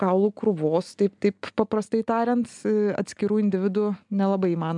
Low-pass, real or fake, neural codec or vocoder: 10.8 kHz; real; none